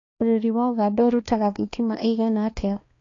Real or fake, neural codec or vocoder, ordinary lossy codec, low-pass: fake; codec, 16 kHz, 2 kbps, X-Codec, HuBERT features, trained on balanced general audio; AAC, 32 kbps; 7.2 kHz